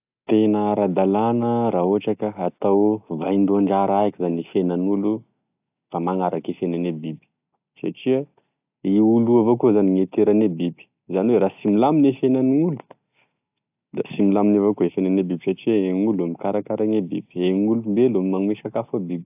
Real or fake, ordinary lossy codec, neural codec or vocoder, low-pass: real; AAC, 32 kbps; none; 3.6 kHz